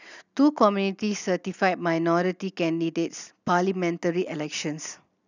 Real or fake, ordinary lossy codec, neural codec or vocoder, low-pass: real; none; none; 7.2 kHz